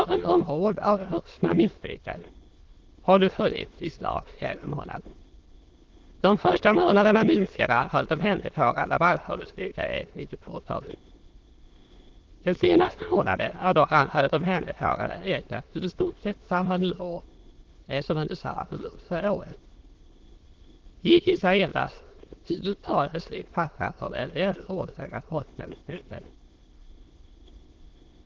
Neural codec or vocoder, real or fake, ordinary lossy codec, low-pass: autoencoder, 22.05 kHz, a latent of 192 numbers a frame, VITS, trained on many speakers; fake; Opus, 16 kbps; 7.2 kHz